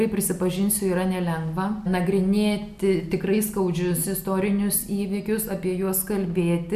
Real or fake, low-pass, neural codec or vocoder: real; 14.4 kHz; none